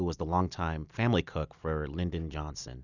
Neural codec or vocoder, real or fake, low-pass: none; real; 7.2 kHz